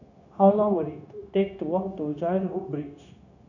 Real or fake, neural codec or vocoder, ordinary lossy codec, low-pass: fake; codec, 16 kHz, 0.9 kbps, LongCat-Audio-Codec; none; 7.2 kHz